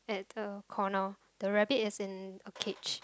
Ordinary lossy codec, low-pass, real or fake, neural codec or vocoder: none; none; real; none